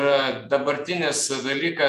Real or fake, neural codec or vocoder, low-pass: fake; vocoder, 48 kHz, 128 mel bands, Vocos; 14.4 kHz